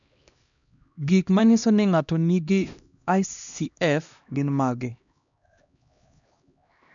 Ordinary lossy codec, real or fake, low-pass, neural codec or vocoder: none; fake; 7.2 kHz; codec, 16 kHz, 1 kbps, X-Codec, HuBERT features, trained on LibriSpeech